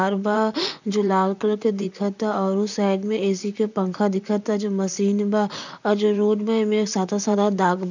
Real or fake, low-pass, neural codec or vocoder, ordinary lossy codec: fake; 7.2 kHz; vocoder, 44.1 kHz, 128 mel bands, Pupu-Vocoder; none